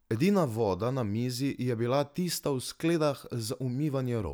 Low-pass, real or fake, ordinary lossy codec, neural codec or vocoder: none; real; none; none